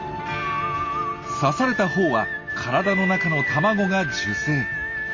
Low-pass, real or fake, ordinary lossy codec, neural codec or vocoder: 7.2 kHz; real; Opus, 32 kbps; none